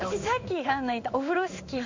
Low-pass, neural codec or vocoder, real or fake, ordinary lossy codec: 7.2 kHz; codec, 16 kHz in and 24 kHz out, 1 kbps, XY-Tokenizer; fake; MP3, 48 kbps